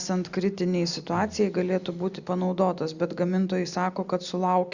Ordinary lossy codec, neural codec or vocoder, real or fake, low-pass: Opus, 64 kbps; none; real; 7.2 kHz